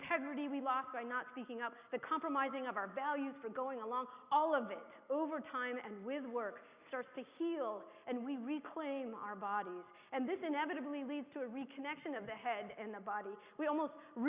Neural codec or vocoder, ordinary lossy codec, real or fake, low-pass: none; Opus, 64 kbps; real; 3.6 kHz